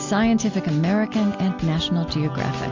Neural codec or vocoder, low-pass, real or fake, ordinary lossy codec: none; 7.2 kHz; real; MP3, 64 kbps